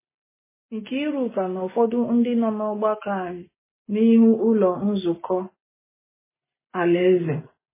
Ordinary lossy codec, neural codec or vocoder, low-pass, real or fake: MP3, 16 kbps; none; 3.6 kHz; real